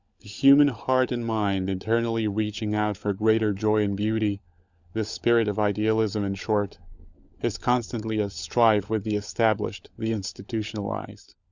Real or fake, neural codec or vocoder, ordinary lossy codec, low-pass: fake; codec, 16 kHz, 16 kbps, FunCodec, trained on LibriTTS, 50 frames a second; Opus, 64 kbps; 7.2 kHz